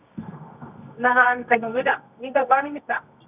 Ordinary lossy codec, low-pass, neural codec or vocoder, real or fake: none; 3.6 kHz; codec, 24 kHz, 0.9 kbps, WavTokenizer, medium music audio release; fake